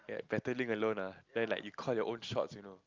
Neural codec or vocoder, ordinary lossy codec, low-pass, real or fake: none; Opus, 24 kbps; 7.2 kHz; real